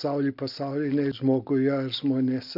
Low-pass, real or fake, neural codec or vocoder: 5.4 kHz; real; none